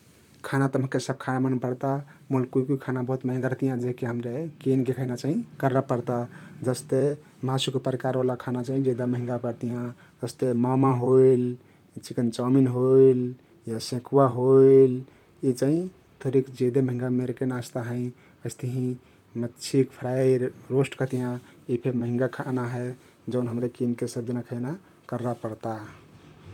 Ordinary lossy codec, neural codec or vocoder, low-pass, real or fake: none; vocoder, 44.1 kHz, 128 mel bands, Pupu-Vocoder; 19.8 kHz; fake